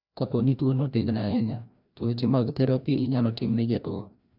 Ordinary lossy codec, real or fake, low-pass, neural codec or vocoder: none; fake; 5.4 kHz; codec, 16 kHz, 1 kbps, FreqCodec, larger model